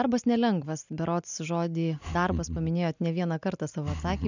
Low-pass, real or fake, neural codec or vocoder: 7.2 kHz; real; none